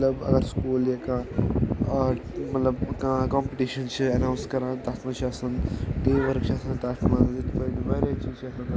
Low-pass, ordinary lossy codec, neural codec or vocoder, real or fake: none; none; none; real